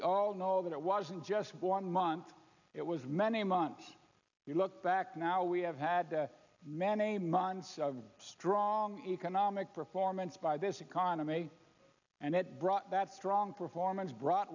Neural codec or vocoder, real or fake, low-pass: none; real; 7.2 kHz